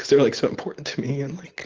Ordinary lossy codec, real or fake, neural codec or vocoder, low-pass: Opus, 16 kbps; real; none; 7.2 kHz